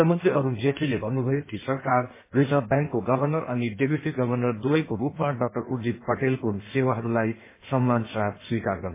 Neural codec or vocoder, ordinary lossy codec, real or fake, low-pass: codec, 16 kHz in and 24 kHz out, 1.1 kbps, FireRedTTS-2 codec; MP3, 16 kbps; fake; 3.6 kHz